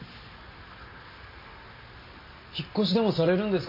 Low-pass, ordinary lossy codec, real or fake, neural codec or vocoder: 5.4 kHz; MP3, 24 kbps; real; none